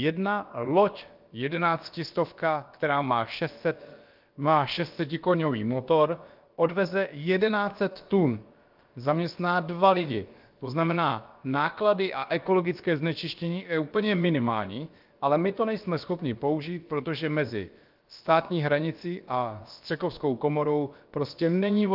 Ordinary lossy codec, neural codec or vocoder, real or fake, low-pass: Opus, 32 kbps; codec, 16 kHz, about 1 kbps, DyCAST, with the encoder's durations; fake; 5.4 kHz